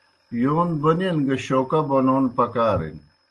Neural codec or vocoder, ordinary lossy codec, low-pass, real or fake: none; Opus, 24 kbps; 10.8 kHz; real